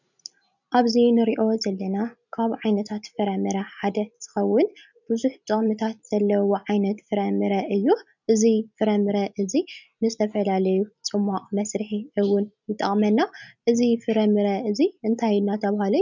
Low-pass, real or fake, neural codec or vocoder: 7.2 kHz; real; none